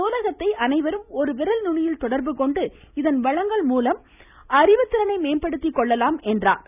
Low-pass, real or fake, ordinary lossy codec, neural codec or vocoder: 3.6 kHz; real; none; none